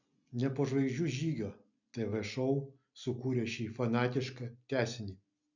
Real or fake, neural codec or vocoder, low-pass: real; none; 7.2 kHz